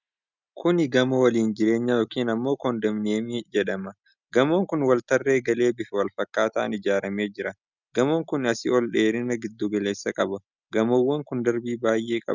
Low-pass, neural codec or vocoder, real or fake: 7.2 kHz; none; real